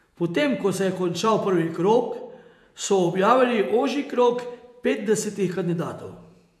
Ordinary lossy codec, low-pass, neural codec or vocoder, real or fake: AAC, 96 kbps; 14.4 kHz; vocoder, 48 kHz, 128 mel bands, Vocos; fake